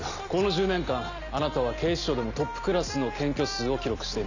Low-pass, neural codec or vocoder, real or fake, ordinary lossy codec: 7.2 kHz; none; real; none